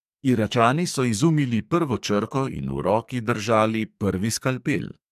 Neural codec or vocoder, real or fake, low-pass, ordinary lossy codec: codec, 44.1 kHz, 2.6 kbps, SNAC; fake; 14.4 kHz; MP3, 96 kbps